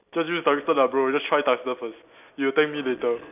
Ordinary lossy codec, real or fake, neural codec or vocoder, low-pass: none; real; none; 3.6 kHz